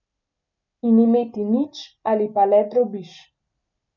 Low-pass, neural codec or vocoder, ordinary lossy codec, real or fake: 7.2 kHz; none; none; real